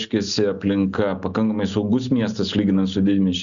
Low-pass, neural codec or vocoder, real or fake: 7.2 kHz; none; real